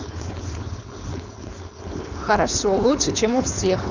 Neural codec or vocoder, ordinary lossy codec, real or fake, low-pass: codec, 16 kHz, 4.8 kbps, FACodec; none; fake; 7.2 kHz